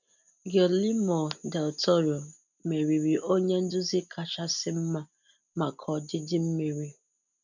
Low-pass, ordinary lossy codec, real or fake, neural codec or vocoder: 7.2 kHz; none; real; none